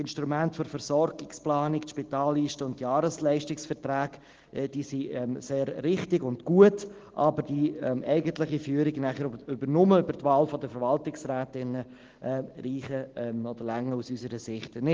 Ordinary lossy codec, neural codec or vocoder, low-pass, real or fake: Opus, 16 kbps; none; 7.2 kHz; real